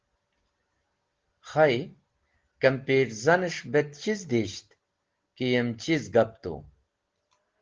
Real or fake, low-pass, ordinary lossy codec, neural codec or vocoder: real; 7.2 kHz; Opus, 16 kbps; none